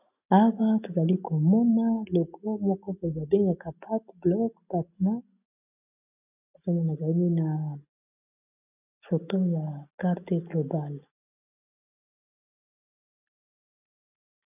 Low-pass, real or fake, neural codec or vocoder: 3.6 kHz; real; none